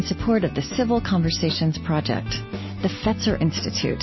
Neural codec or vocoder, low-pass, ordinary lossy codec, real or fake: none; 7.2 kHz; MP3, 24 kbps; real